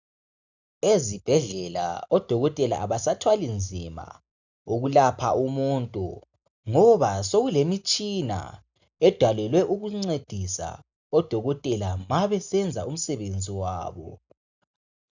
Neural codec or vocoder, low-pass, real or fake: none; 7.2 kHz; real